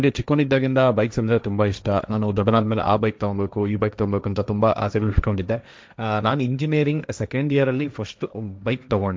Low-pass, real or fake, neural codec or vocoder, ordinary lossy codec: none; fake; codec, 16 kHz, 1.1 kbps, Voila-Tokenizer; none